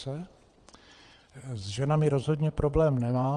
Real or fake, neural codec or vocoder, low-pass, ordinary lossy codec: fake; vocoder, 22.05 kHz, 80 mel bands, WaveNeXt; 9.9 kHz; Opus, 32 kbps